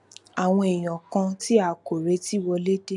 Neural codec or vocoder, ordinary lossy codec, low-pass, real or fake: none; none; 10.8 kHz; real